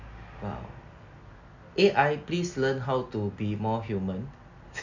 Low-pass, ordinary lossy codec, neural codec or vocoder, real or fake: 7.2 kHz; none; none; real